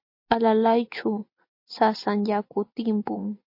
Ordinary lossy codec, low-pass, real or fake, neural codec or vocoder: MP3, 32 kbps; 5.4 kHz; real; none